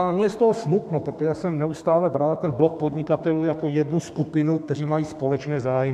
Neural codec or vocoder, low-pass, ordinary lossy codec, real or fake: codec, 32 kHz, 1.9 kbps, SNAC; 14.4 kHz; AAC, 96 kbps; fake